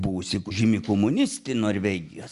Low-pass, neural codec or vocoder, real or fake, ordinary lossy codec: 10.8 kHz; none; real; Opus, 64 kbps